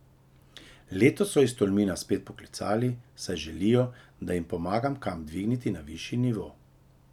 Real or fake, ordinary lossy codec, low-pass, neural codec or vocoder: real; none; 19.8 kHz; none